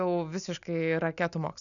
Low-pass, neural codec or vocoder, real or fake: 7.2 kHz; none; real